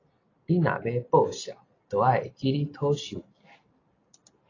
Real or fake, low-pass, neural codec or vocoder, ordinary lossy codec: real; 7.2 kHz; none; AAC, 32 kbps